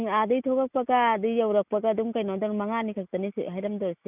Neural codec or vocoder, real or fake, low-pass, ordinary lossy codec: none; real; 3.6 kHz; none